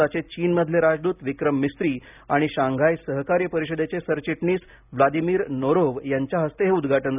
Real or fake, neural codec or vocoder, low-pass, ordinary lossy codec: real; none; 3.6 kHz; none